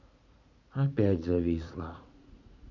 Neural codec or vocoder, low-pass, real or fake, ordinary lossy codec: vocoder, 44.1 kHz, 80 mel bands, Vocos; 7.2 kHz; fake; none